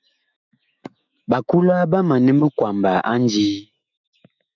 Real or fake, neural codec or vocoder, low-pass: fake; autoencoder, 48 kHz, 128 numbers a frame, DAC-VAE, trained on Japanese speech; 7.2 kHz